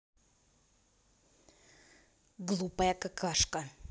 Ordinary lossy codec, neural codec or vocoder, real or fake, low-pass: none; none; real; none